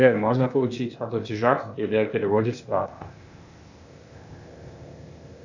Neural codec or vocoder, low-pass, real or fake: codec, 16 kHz, 0.8 kbps, ZipCodec; 7.2 kHz; fake